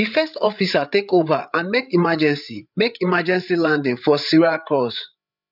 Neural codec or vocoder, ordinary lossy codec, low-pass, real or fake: codec, 16 kHz, 8 kbps, FreqCodec, larger model; none; 5.4 kHz; fake